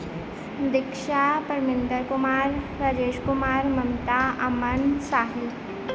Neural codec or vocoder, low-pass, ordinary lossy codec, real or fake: none; none; none; real